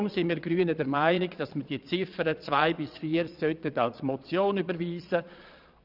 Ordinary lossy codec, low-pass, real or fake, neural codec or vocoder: none; 5.4 kHz; fake; vocoder, 22.05 kHz, 80 mel bands, Vocos